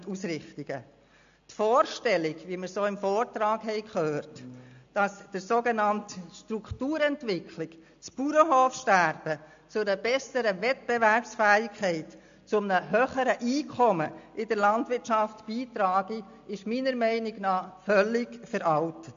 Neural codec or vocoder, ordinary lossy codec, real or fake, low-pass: none; AAC, 64 kbps; real; 7.2 kHz